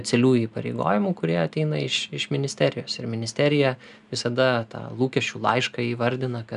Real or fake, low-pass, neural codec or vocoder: real; 10.8 kHz; none